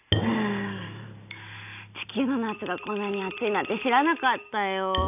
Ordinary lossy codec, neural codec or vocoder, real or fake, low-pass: none; none; real; 3.6 kHz